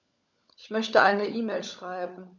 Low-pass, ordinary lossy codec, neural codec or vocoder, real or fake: 7.2 kHz; none; codec, 16 kHz, 16 kbps, FunCodec, trained on LibriTTS, 50 frames a second; fake